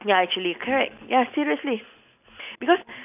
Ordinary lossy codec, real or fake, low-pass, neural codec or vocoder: none; real; 3.6 kHz; none